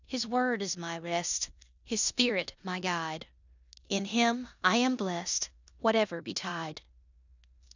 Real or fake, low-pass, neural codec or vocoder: fake; 7.2 kHz; codec, 16 kHz in and 24 kHz out, 0.9 kbps, LongCat-Audio-Codec, four codebook decoder